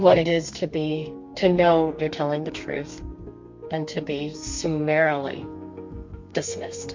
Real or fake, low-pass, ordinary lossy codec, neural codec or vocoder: fake; 7.2 kHz; MP3, 48 kbps; codec, 32 kHz, 1.9 kbps, SNAC